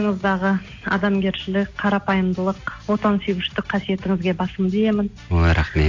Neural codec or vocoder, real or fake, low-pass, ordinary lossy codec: none; real; 7.2 kHz; AAC, 48 kbps